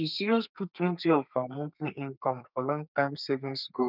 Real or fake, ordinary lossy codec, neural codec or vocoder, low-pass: fake; none; codec, 32 kHz, 1.9 kbps, SNAC; 5.4 kHz